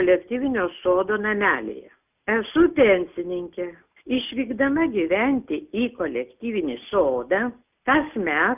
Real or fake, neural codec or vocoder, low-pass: real; none; 3.6 kHz